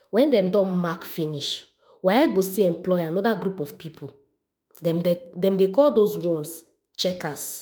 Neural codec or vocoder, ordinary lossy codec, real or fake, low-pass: autoencoder, 48 kHz, 32 numbers a frame, DAC-VAE, trained on Japanese speech; none; fake; none